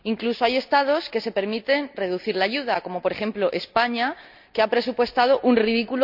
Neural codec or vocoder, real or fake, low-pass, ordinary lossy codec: none; real; 5.4 kHz; none